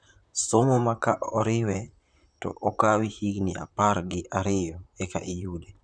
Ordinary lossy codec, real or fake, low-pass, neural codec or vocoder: none; fake; 9.9 kHz; vocoder, 44.1 kHz, 128 mel bands, Pupu-Vocoder